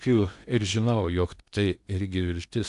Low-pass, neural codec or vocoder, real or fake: 10.8 kHz; codec, 16 kHz in and 24 kHz out, 0.8 kbps, FocalCodec, streaming, 65536 codes; fake